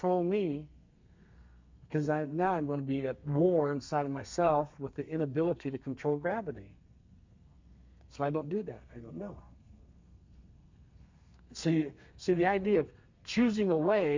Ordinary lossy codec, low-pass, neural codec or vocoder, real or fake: MP3, 48 kbps; 7.2 kHz; codec, 32 kHz, 1.9 kbps, SNAC; fake